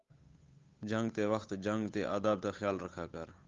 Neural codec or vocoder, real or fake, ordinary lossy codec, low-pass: codec, 16 kHz, 8 kbps, FunCodec, trained on Chinese and English, 25 frames a second; fake; Opus, 32 kbps; 7.2 kHz